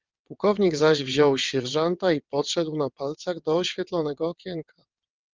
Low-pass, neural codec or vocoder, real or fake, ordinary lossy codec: 7.2 kHz; vocoder, 22.05 kHz, 80 mel bands, WaveNeXt; fake; Opus, 24 kbps